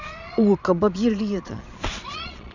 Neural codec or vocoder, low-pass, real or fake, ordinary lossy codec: vocoder, 22.05 kHz, 80 mel bands, Vocos; 7.2 kHz; fake; none